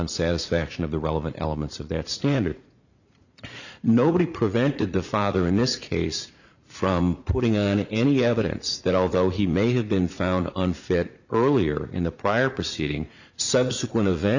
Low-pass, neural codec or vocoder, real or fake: 7.2 kHz; vocoder, 44.1 kHz, 80 mel bands, Vocos; fake